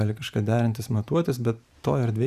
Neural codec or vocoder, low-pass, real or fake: vocoder, 44.1 kHz, 128 mel bands every 512 samples, BigVGAN v2; 14.4 kHz; fake